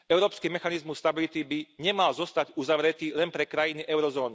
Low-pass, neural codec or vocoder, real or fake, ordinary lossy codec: none; none; real; none